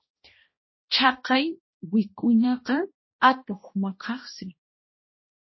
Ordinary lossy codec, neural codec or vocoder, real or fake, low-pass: MP3, 24 kbps; codec, 16 kHz, 1 kbps, X-Codec, HuBERT features, trained on balanced general audio; fake; 7.2 kHz